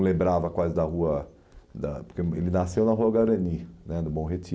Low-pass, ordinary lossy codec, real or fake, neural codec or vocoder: none; none; real; none